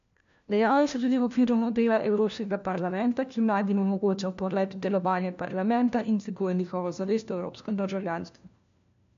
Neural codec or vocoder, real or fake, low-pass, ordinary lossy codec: codec, 16 kHz, 1 kbps, FunCodec, trained on LibriTTS, 50 frames a second; fake; 7.2 kHz; MP3, 64 kbps